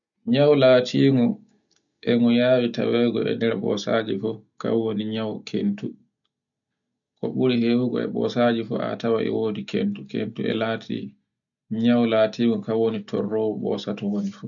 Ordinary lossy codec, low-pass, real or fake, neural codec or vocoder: none; 7.2 kHz; real; none